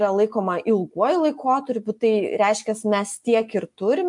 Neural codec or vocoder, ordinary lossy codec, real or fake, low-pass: none; MP3, 64 kbps; real; 10.8 kHz